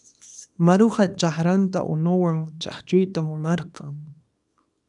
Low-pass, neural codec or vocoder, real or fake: 10.8 kHz; codec, 24 kHz, 0.9 kbps, WavTokenizer, small release; fake